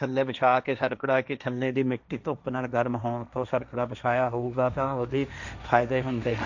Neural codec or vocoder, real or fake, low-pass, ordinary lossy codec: codec, 16 kHz, 1.1 kbps, Voila-Tokenizer; fake; 7.2 kHz; none